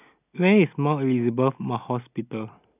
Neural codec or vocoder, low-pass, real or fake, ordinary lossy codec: none; 3.6 kHz; real; none